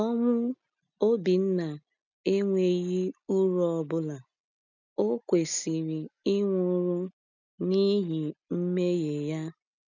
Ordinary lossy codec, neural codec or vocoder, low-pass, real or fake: none; none; 7.2 kHz; real